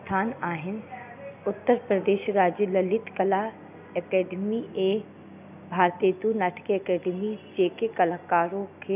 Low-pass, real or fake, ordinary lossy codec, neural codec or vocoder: 3.6 kHz; real; none; none